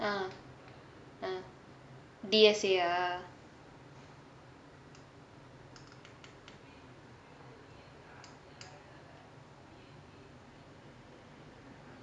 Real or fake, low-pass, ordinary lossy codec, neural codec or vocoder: real; 9.9 kHz; none; none